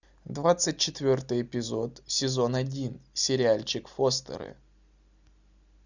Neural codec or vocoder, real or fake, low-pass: none; real; 7.2 kHz